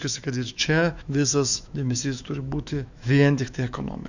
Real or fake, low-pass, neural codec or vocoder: fake; 7.2 kHz; autoencoder, 48 kHz, 128 numbers a frame, DAC-VAE, trained on Japanese speech